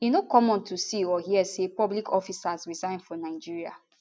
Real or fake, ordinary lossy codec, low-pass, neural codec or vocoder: real; none; none; none